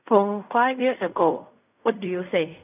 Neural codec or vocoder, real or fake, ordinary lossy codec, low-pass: codec, 16 kHz in and 24 kHz out, 0.4 kbps, LongCat-Audio-Codec, fine tuned four codebook decoder; fake; none; 3.6 kHz